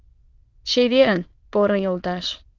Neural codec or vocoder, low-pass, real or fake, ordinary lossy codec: autoencoder, 22.05 kHz, a latent of 192 numbers a frame, VITS, trained on many speakers; 7.2 kHz; fake; Opus, 16 kbps